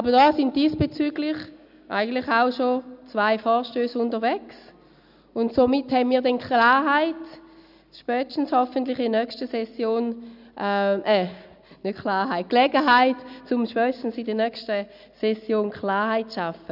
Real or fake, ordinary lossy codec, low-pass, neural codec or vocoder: real; none; 5.4 kHz; none